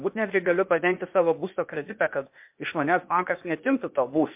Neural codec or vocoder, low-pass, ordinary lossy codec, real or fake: codec, 16 kHz, 0.8 kbps, ZipCodec; 3.6 kHz; MP3, 32 kbps; fake